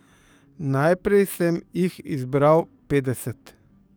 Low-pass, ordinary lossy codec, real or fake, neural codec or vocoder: none; none; fake; codec, 44.1 kHz, 7.8 kbps, DAC